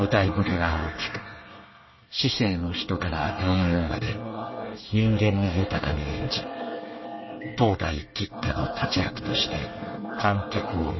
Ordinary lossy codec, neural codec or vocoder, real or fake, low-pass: MP3, 24 kbps; codec, 24 kHz, 1 kbps, SNAC; fake; 7.2 kHz